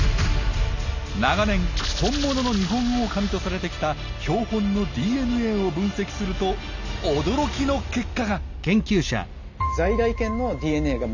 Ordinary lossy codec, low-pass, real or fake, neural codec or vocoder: none; 7.2 kHz; real; none